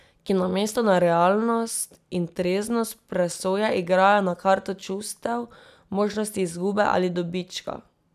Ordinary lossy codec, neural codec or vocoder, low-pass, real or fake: none; none; 14.4 kHz; real